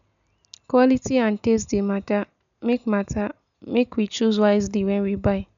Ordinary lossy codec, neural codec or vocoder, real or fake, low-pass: none; none; real; 7.2 kHz